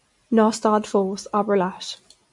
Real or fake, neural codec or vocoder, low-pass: real; none; 10.8 kHz